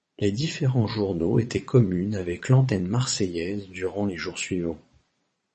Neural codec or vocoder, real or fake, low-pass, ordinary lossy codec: vocoder, 22.05 kHz, 80 mel bands, Vocos; fake; 9.9 kHz; MP3, 32 kbps